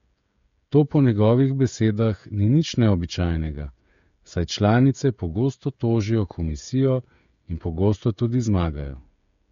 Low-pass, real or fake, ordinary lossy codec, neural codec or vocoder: 7.2 kHz; fake; MP3, 48 kbps; codec, 16 kHz, 16 kbps, FreqCodec, smaller model